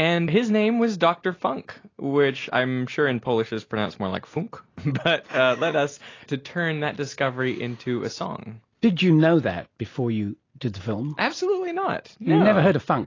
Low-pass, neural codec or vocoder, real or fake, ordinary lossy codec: 7.2 kHz; none; real; AAC, 32 kbps